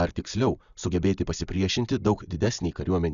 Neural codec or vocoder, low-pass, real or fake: none; 7.2 kHz; real